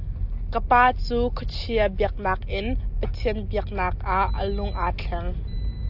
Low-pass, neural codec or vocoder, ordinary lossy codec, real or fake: 5.4 kHz; none; AAC, 48 kbps; real